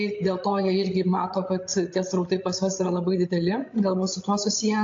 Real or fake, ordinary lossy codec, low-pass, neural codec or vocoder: fake; AAC, 48 kbps; 7.2 kHz; codec, 16 kHz, 16 kbps, FreqCodec, larger model